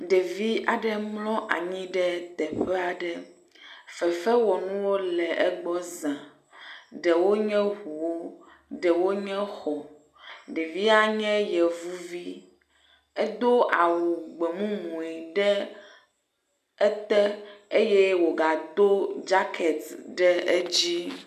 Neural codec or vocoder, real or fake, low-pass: none; real; 14.4 kHz